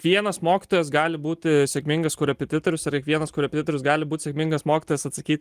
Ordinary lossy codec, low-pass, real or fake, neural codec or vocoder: Opus, 24 kbps; 14.4 kHz; real; none